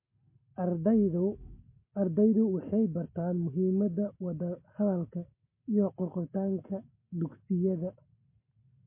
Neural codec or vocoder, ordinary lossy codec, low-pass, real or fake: none; MP3, 24 kbps; 3.6 kHz; real